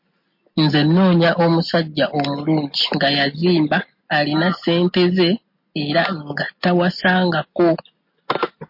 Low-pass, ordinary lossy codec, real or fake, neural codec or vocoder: 5.4 kHz; MP3, 32 kbps; real; none